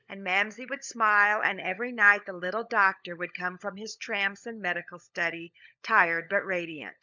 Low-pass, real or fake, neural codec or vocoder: 7.2 kHz; fake; codec, 16 kHz, 16 kbps, FunCodec, trained on LibriTTS, 50 frames a second